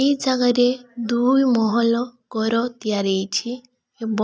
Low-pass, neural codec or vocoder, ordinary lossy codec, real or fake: none; none; none; real